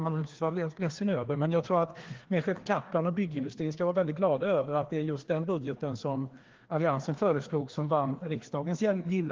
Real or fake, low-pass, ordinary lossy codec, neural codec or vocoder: fake; 7.2 kHz; Opus, 16 kbps; codec, 16 kHz, 2 kbps, FreqCodec, larger model